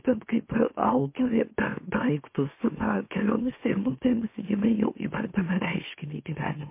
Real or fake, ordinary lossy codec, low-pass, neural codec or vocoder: fake; MP3, 24 kbps; 3.6 kHz; autoencoder, 44.1 kHz, a latent of 192 numbers a frame, MeloTTS